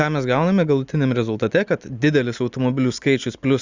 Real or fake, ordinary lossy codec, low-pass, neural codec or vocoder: real; Opus, 64 kbps; 7.2 kHz; none